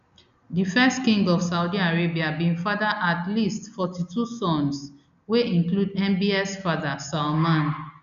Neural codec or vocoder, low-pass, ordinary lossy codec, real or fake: none; 7.2 kHz; none; real